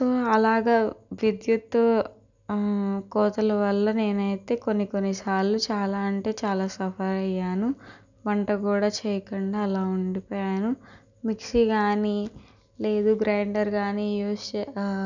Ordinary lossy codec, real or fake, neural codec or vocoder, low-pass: none; real; none; 7.2 kHz